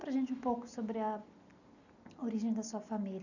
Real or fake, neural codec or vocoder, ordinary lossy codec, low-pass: real; none; none; 7.2 kHz